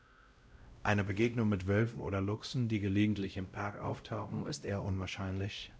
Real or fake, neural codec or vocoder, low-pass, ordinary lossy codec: fake; codec, 16 kHz, 0.5 kbps, X-Codec, WavLM features, trained on Multilingual LibriSpeech; none; none